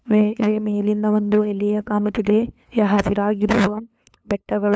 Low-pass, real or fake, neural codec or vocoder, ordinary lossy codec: none; fake; codec, 16 kHz, 2 kbps, FunCodec, trained on LibriTTS, 25 frames a second; none